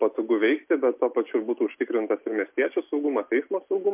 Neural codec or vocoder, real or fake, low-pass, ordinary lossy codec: none; real; 3.6 kHz; MP3, 32 kbps